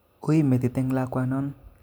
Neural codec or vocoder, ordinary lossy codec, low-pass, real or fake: none; none; none; real